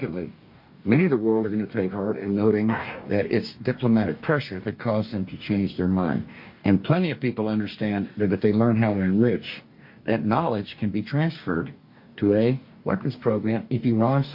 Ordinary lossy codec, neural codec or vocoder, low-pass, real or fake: MP3, 32 kbps; codec, 44.1 kHz, 2.6 kbps, DAC; 5.4 kHz; fake